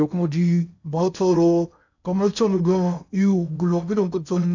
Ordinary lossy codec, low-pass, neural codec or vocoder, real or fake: none; 7.2 kHz; codec, 16 kHz in and 24 kHz out, 0.6 kbps, FocalCodec, streaming, 2048 codes; fake